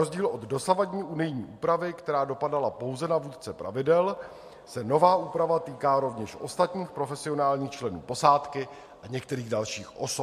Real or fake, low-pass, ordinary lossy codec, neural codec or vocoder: real; 14.4 kHz; MP3, 64 kbps; none